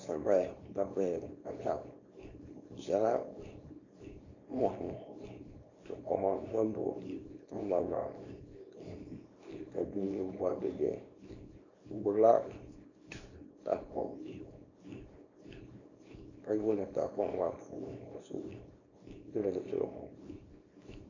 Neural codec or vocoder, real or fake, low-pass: codec, 24 kHz, 0.9 kbps, WavTokenizer, small release; fake; 7.2 kHz